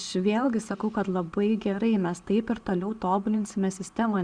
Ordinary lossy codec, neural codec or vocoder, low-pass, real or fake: Opus, 64 kbps; none; 9.9 kHz; real